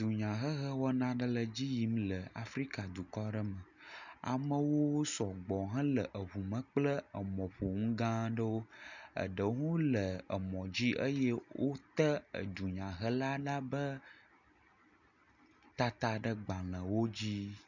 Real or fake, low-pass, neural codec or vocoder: real; 7.2 kHz; none